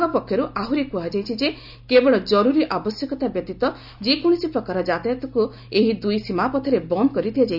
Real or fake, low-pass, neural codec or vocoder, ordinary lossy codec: real; 5.4 kHz; none; none